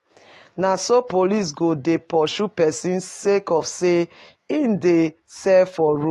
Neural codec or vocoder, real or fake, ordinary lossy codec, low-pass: autoencoder, 48 kHz, 128 numbers a frame, DAC-VAE, trained on Japanese speech; fake; AAC, 32 kbps; 19.8 kHz